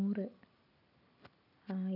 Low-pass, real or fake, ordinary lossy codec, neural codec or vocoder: 5.4 kHz; real; none; none